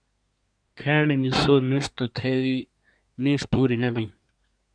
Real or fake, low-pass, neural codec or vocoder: fake; 9.9 kHz; codec, 24 kHz, 1 kbps, SNAC